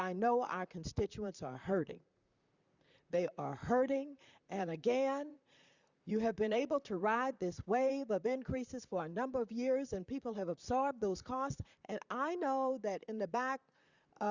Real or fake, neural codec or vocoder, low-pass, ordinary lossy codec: fake; vocoder, 44.1 kHz, 128 mel bands, Pupu-Vocoder; 7.2 kHz; Opus, 64 kbps